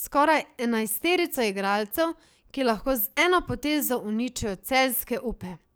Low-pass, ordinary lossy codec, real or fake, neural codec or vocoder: none; none; fake; codec, 44.1 kHz, 7.8 kbps, DAC